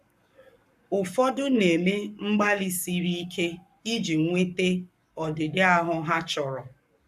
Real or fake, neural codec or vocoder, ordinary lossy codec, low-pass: fake; codec, 44.1 kHz, 7.8 kbps, Pupu-Codec; none; 14.4 kHz